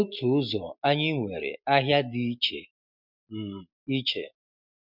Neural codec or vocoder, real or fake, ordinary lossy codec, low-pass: none; real; MP3, 48 kbps; 5.4 kHz